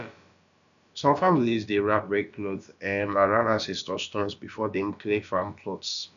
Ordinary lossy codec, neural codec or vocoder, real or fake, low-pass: none; codec, 16 kHz, about 1 kbps, DyCAST, with the encoder's durations; fake; 7.2 kHz